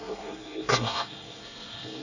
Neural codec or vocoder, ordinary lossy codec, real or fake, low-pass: codec, 24 kHz, 1 kbps, SNAC; none; fake; 7.2 kHz